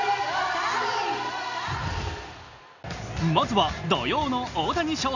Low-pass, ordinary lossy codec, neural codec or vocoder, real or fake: 7.2 kHz; none; none; real